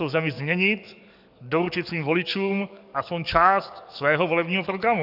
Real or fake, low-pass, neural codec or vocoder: fake; 5.4 kHz; codec, 44.1 kHz, 7.8 kbps, DAC